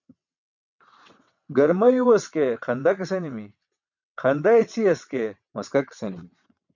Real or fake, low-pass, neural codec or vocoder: fake; 7.2 kHz; vocoder, 22.05 kHz, 80 mel bands, WaveNeXt